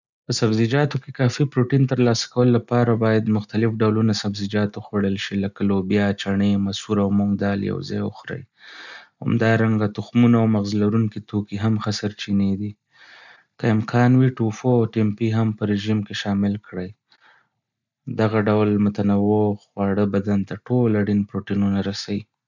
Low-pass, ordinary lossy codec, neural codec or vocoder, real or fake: 7.2 kHz; none; none; real